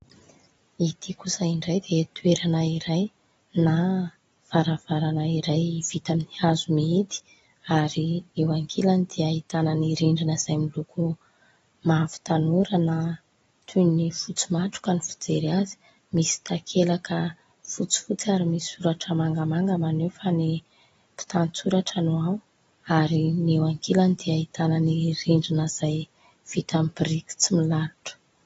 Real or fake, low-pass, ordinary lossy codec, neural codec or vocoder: real; 10.8 kHz; AAC, 24 kbps; none